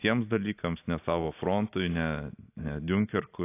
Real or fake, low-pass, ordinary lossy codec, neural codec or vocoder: real; 3.6 kHz; AAC, 24 kbps; none